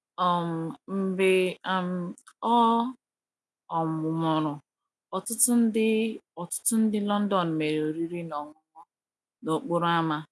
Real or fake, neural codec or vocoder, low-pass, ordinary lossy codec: real; none; none; none